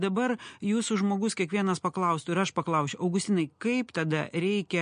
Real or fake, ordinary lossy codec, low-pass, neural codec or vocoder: real; MP3, 48 kbps; 9.9 kHz; none